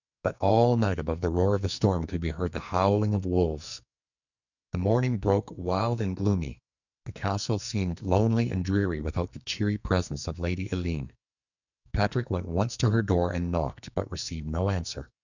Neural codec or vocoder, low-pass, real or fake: codec, 44.1 kHz, 2.6 kbps, SNAC; 7.2 kHz; fake